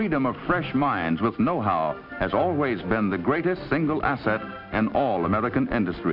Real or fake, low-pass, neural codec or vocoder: real; 5.4 kHz; none